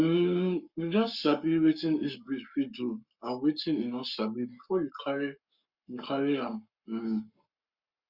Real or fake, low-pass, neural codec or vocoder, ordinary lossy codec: fake; 5.4 kHz; codec, 44.1 kHz, 7.8 kbps, Pupu-Codec; Opus, 64 kbps